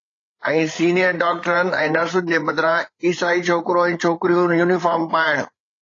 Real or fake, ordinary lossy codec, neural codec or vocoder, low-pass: fake; AAC, 32 kbps; codec, 16 kHz, 8 kbps, FreqCodec, larger model; 7.2 kHz